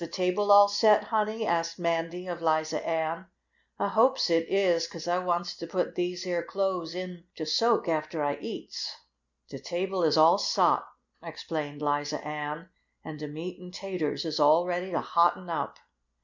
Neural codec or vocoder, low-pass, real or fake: none; 7.2 kHz; real